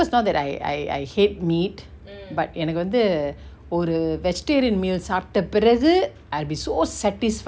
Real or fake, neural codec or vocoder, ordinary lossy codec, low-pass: real; none; none; none